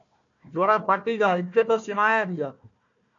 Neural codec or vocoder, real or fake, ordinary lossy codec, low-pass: codec, 16 kHz, 1 kbps, FunCodec, trained on Chinese and English, 50 frames a second; fake; MP3, 48 kbps; 7.2 kHz